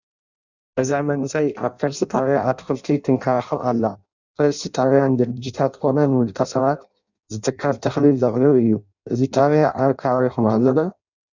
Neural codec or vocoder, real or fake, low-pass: codec, 16 kHz in and 24 kHz out, 0.6 kbps, FireRedTTS-2 codec; fake; 7.2 kHz